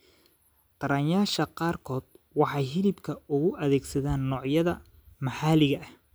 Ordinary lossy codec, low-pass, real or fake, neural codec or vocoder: none; none; real; none